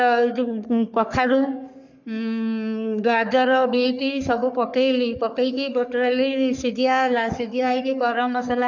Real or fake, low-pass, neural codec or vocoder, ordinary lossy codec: fake; 7.2 kHz; codec, 44.1 kHz, 3.4 kbps, Pupu-Codec; none